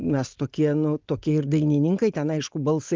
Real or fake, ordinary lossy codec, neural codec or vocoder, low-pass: real; Opus, 32 kbps; none; 7.2 kHz